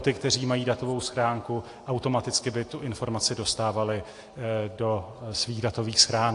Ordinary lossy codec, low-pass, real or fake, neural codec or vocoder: AAC, 48 kbps; 10.8 kHz; real; none